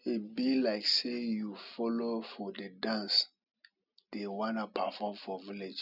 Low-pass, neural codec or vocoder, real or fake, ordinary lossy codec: 5.4 kHz; none; real; none